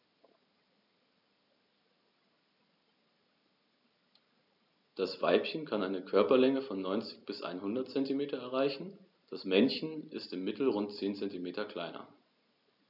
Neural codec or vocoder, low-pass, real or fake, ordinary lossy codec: none; 5.4 kHz; real; none